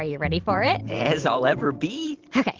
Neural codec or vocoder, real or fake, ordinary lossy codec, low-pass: vocoder, 44.1 kHz, 128 mel bands every 512 samples, BigVGAN v2; fake; Opus, 16 kbps; 7.2 kHz